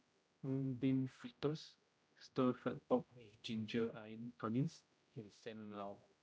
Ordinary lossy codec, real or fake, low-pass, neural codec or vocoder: none; fake; none; codec, 16 kHz, 0.5 kbps, X-Codec, HuBERT features, trained on general audio